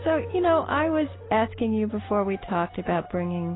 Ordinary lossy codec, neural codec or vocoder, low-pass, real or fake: AAC, 16 kbps; none; 7.2 kHz; real